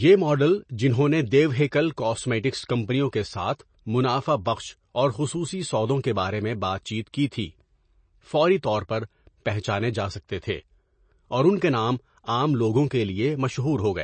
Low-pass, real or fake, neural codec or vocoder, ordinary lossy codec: 9.9 kHz; real; none; MP3, 32 kbps